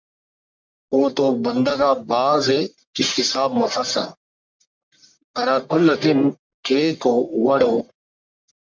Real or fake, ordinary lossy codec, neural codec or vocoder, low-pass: fake; MP3, 64 kbps; codec, 44.1 kHz, 1.7 kbps, Pupu-Codec; 7.2 kHz